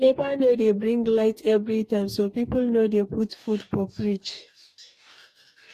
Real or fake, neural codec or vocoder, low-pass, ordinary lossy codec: fake; codec, 44.1 kHz, 2.6 kbps, DAC; 14.4 kHz; AAC, 64 kbps